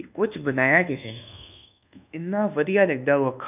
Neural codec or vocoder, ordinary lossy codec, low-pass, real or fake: codec, 24 kHz, 1.2 kbps, DualCodec; none; 3.6 kHz; fake